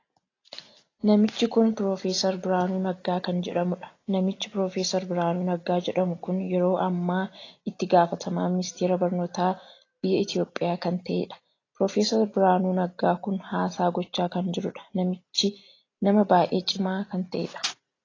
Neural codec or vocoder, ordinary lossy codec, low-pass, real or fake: none; AAC, 32 kbps; 7.2 kHz; real